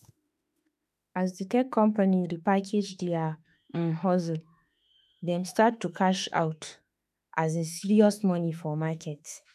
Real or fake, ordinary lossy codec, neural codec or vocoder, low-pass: fake; none; autoencoder, 48 kHz, 32 numbers a frame, DAC-VAE, trained on Japanese speech; 14.4 kHz